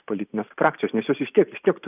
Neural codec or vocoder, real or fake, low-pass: vocoder, 44.1 kHz, 128 mel bands every 512 samples, BigVGAN v2; fake; 3.6 kHz